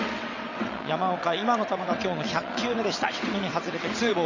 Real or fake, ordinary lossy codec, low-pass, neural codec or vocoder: fake; Opus, 64 kbps; 7.2 kHz; vocoder, 22.05 kHz, 80 mel bands, WaveNeXt